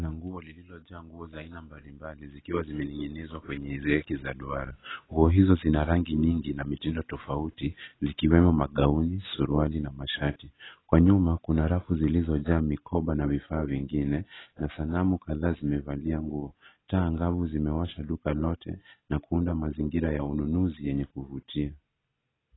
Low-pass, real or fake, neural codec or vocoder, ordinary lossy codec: 7.2 kHz; real; none; AAC, 16 kbps